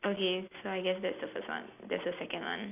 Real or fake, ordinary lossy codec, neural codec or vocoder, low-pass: real; none; none; 3.6 kHz